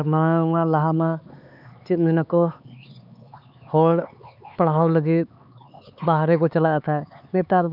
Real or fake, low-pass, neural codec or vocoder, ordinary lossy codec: fake; 5.4 kHz; codec, 16 kHz, 4 kbps, X-Codec, HuBERT features, trained on LibriSpeech; none